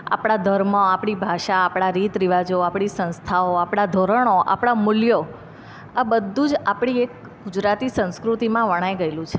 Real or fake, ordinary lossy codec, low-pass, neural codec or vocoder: real; none; none; none